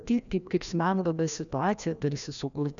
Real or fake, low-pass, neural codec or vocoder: fake; 7.2 kHz; codec, 16 kHz, 1 kbps, FreqCodec, larger model